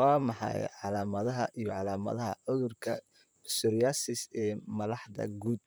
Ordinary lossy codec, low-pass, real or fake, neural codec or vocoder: none; none; fake; vocoder, 44.1 kHz, 128 mel bands, Pupu-Vocoder